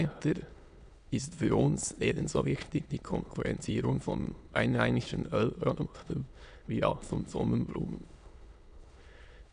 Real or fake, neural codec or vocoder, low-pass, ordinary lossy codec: fake; autoencoder, 22.05 kHz, a latent of 192 numbers a frame, VITS, trained on many speakers; 9.9 kHz; none